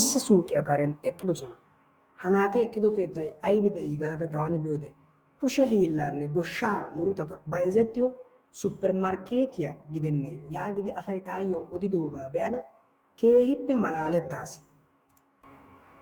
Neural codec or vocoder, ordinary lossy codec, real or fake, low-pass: codec, 44.1 kHz, 2.6 kbps, DAC; Opus, 64 kbps; fake; 19.8 kHz